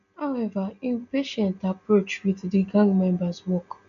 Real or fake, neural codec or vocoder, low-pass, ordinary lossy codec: real; none; 7.2 kHz; none